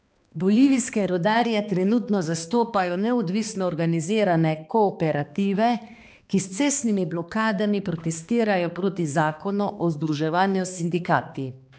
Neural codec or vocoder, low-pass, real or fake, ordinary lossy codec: codec, 16 kHz, 2 kbps, X-Codec, HuBERT features, trained on balanced general audio; none; fake; none